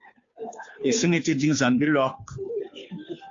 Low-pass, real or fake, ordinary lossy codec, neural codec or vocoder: 7.2 kHz; fake; AAC, 48 kbps; codec, 16 kHz, 2 kbps, FunCodec, trained on Chinese and English, 25 frames a second